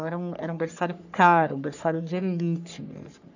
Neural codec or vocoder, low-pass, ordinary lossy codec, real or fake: codec, 44.1 kHz, 3.4 kbps, Pupu-Codec; 7.2 kHz; none; fake